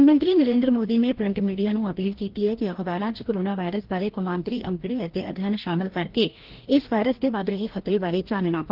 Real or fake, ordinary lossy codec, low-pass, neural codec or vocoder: fake; Opus, 16 kbps; 5.4 kHz; codec, 16 kHz, 1 kbps, FreqCodec, larger model